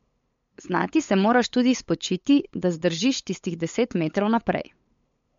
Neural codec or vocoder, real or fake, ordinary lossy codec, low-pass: codec, 16 kHz, 8 kbps, FunCodec, trained on LibriTTS, 25 frames a second; fake; MP3, 48 kbps; 7.2 kHz